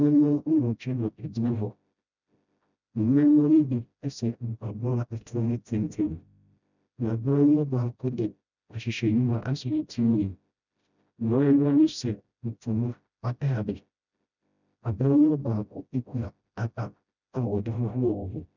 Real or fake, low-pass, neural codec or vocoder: fake; 7.2 kHz; codec, 16 kHz, 0.5 kbps, FreqCodec, smaller model